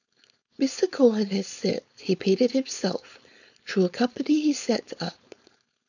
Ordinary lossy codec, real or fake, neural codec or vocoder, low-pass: none; fake; codec, 16 kHz, 4.8 kbps, FACodec; 7.2 kHz